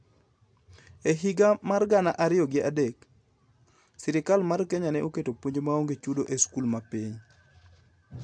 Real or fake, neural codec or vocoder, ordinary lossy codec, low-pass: real; none; AAC, 64 kbps; 9.9 kHz